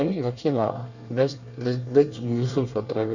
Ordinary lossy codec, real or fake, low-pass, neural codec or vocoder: none; fake; 7.2 kHz; codec, 24 kHz, 1 kbps, SNAC